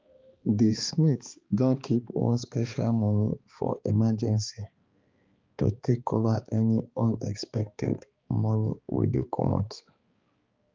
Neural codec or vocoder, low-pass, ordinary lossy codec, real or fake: codec, 16 kHz, 2 kbps, X-Codec, HuBERT features, trained on balanced general audio; 7.2 kHz; Opus, 24 kbps; fake